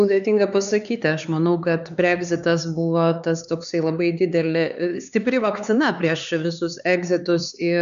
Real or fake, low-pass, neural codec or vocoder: fake; 7.2 kHz; codec, 16 kHz, 4 kbps, X-Codec, HuBERT features, trained on LibriSpeech